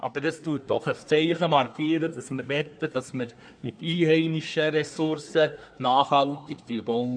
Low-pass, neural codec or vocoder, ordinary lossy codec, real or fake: 9.9 kHz; codec, 24 kHz, 1 kbps, SNAC; none; fake